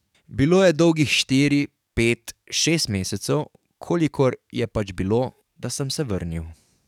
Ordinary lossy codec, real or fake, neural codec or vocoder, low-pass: none; fake; codec, 44.1 kHz, 7.8 kbps, DAC; 19.8 kHz